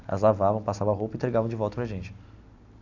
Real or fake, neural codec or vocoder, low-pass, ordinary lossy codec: real; none; 7.2 kHz; none